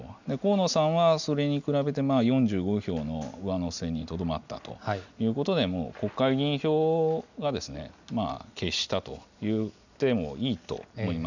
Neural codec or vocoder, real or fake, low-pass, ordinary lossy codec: none; real; 7.2 kHz; none